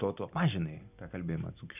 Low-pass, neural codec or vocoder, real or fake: 3.6 kHz; none; real